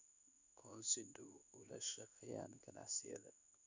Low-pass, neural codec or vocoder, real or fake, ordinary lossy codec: 7.2 kHz; codec, 16 kHz in and 24 kHz out, 1 kbps, XY-Tokenizer; fake; none